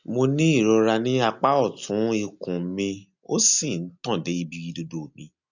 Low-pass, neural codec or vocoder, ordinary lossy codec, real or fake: 7.2 kHz; none; none; real